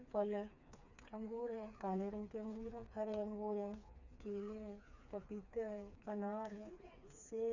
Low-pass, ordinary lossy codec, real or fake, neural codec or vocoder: 7.2 kHz; none; fake; codec, 16 kHz, 4 kbps, FreqCodec, smaller model